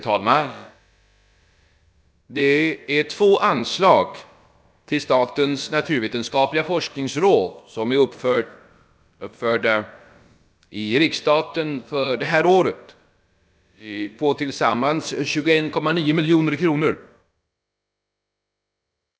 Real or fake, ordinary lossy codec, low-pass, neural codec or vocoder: fake; none; none; codec, 16 kHz, about 1 kbps, DyCAST, with the encoder's durations